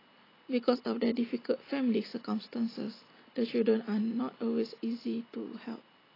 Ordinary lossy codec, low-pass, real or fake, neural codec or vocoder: AAC, 24 kbps; 5.4 kHz; real; none